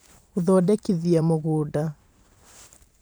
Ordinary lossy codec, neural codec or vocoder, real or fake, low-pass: none; vocoder, 44.1 kHz, 128 mel bands every 512 samples, BigVGAN v2; fake; none